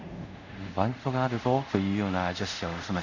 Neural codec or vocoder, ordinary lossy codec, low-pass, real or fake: codec, 24 kHz, 0.5 kbps, DualCodec; none; 7.2 kHz; fake